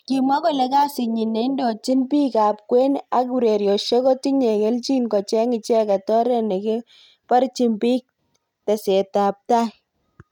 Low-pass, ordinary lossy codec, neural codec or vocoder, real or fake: 19.8 kHz; none; none; real